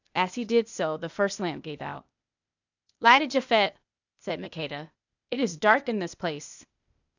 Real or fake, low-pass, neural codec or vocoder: fake; 7.2 kHz; codec, 16 kHz, 0.8 kbps, ZipCodec